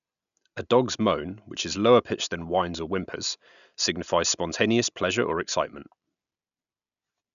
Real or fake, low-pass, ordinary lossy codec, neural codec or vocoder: real; 7.2 kHz; none; none